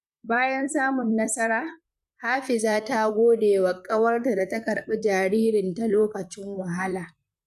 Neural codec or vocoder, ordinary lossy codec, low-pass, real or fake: vocoder, 44.1 kHz, 128 mel bands, Pupu-Vocoder; none; 14.4 kHz; fake